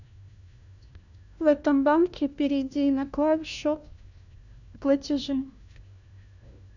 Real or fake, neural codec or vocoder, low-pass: fake; codec, 16 kHz, 1 kbps, FunCodec, trained on LibriTTS, 50 frames a second; 7.2 kHz